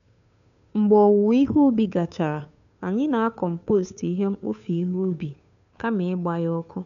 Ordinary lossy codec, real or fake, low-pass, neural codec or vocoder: MP3, 96 kbps; fake; 7.2 kHz; codec, 16 kHz, 2 kbps, FunCodec, trained on Chinese and English, 25 frames a second